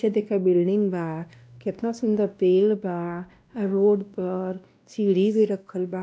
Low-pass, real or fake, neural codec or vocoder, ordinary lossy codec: none; fake; codec, 16 kHz, 1 kbps, X-Codec, WavLM features, trained on Multilingual LibriSpeech; none